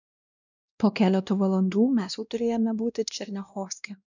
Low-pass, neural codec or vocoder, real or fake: 7.2 kHz; codec, 16 kHz, 1 kbps, X-Codec, WavLM features, trained on Multilingual LibriSpeech; fake